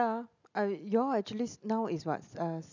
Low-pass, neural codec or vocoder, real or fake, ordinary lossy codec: 7.2 kHz; none; real; none